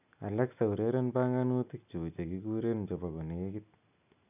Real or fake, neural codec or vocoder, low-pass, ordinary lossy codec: real; none; 3.6 kHz; none